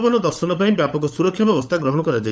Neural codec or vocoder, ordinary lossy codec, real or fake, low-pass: codec, 16 kHz, 16 kbps, FunCodec, trained on LibriTTS, 50 frames a second; none; fake; none